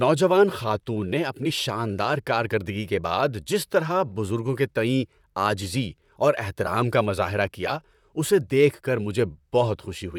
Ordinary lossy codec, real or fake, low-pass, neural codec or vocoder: none; fake; 19.8 kHz; vocoder, 44.1 kHz, 128 mel bands, Pupu-Vocoder